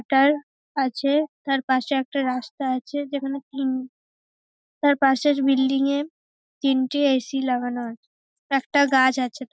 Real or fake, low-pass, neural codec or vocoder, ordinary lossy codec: real; none; none; none